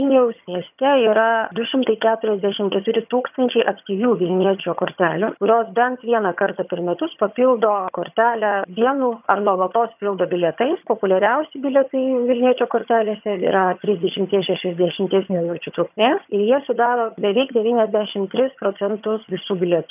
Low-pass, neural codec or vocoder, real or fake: 3.6 kHz; vocoder, 22.05 kHz, 80 mel bands, HiFi-GAN; fake